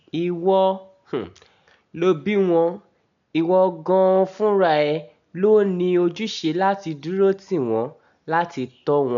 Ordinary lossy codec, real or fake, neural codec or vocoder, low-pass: MP3, 96 kbps; real; none; 7.2 kHz